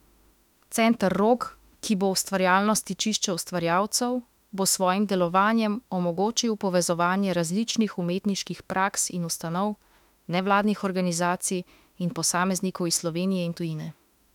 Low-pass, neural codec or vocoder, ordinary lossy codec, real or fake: 19.8 kHz; autoencoder, 48 kHz, 32 numbers a frame, DAC-VAE, trained on Japanese speech; none; fake